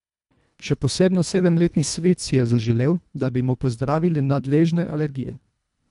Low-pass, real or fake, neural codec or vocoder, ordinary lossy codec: 10.8 kHz; fake; codec, 24 kHz, 1.5 kbps, HILCodec; none